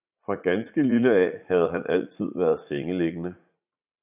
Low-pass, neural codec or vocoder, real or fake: 3.6 kHz; vocoder, 44.1 kHz, 80 mel bands, Vocos; fake